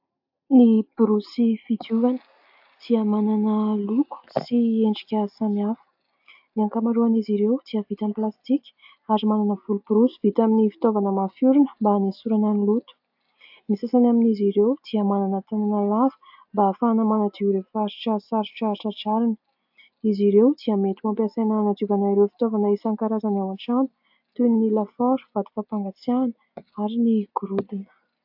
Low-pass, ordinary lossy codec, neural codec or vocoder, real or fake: 5.4 kHz; AAC, 48 kbps; none; real